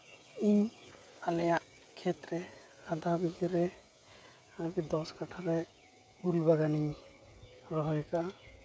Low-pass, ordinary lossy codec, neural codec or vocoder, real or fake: none; none; codec, 16 kHz, 16 kbps, FreqCodec, smaller model; fake